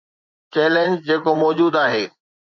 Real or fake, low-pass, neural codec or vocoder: fake; 7.2 kHz; vocoder, 24 kHz, 100 mel bands, Vocos